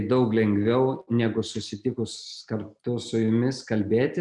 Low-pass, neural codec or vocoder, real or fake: 10.8 kHz; none; real